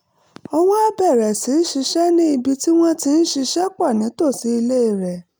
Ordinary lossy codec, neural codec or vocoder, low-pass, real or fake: none; none; none; real